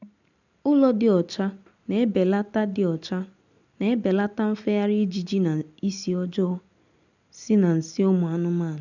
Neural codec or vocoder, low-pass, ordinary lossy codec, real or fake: none; 7.2 kHz; none; real